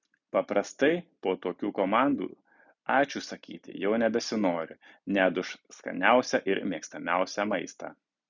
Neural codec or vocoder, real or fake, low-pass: none; real; 7.2 kHz